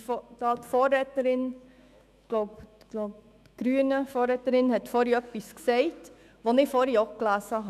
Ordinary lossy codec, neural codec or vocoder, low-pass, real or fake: none; autoencoder, 48 kHz, 128 numbers a frame, DAC-VAE, trained on Japanese speech; 14.4 kHz; fake